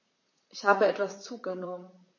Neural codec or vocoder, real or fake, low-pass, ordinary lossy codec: vocoder, 44.1 kHz, 128 mel bands, Pupu-Vocoder; fake; 7.2 kHz; MP3, 32 kbps